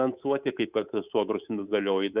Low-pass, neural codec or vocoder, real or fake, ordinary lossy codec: 3.6 kHz; codec, 24 kHz, 3.1 kbps, DualCodec; fake; Opus, 64 kbps